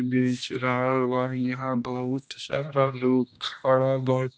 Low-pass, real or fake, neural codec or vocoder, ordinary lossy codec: none; fake; codec, 16 kHz, 1 kbps, X-Codec, HuBERT features, trained on general audio; none